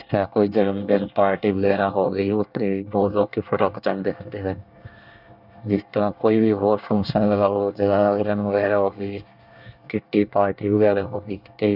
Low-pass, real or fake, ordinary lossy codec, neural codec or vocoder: 5.4 kHz; fake; none; codec, 24 kHz, 1 kbps, SNAC